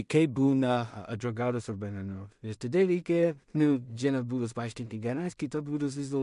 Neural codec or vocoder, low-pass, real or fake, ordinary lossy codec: codec, 16 kHz in and 24 kHz out, 0.4 kbps, LongCat-Audio-Codec, two codebook decoder; 10.8 kHz; fake; MP3, 64 kbps